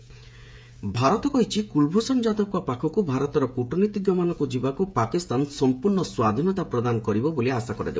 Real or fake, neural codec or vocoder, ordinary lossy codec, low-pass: fake; codec, 16 kHz, 16 kbps, FreqCodec, smaller model; none; none